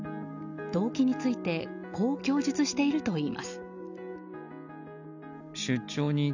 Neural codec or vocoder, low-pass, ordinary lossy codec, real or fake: none; 7.2 kHz; none; real